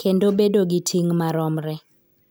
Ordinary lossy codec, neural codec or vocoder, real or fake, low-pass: none; none; real; none